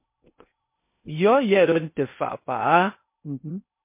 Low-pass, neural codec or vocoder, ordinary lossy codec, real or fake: 3.6 kHz; codec, 16 kHz in and 24 kHz out, 0.6 kbps, FocalCodec, streaming, 2048 codes; MP3, 24 kbps; fake